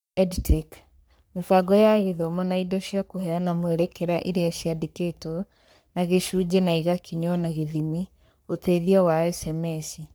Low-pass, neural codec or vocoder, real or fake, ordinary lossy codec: none; codec, 44.1 kHz, 3.4 kbps, Pupu-Codec; fake; none